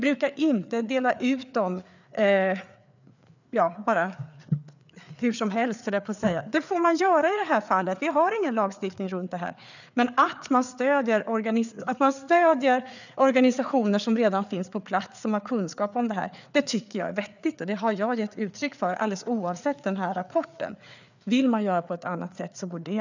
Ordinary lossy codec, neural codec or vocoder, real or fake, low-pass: none; codec, 16 kHz, 4 kbps, FreqCodec, larger model; fake; 7.2 kHz